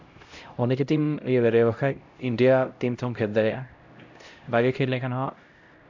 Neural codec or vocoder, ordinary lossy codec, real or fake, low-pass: codec, 16 kHz, 0.5 kbps, X-Codec, HuBERT features, trained on LibriSpeech; MP3, 64 kbps; fake; 7.2 kHz